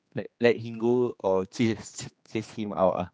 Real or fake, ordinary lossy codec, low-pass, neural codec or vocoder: fake; none; none; codec, 16 kHz, 2 kbps, X-Codec, HuBERT features, trained on general audio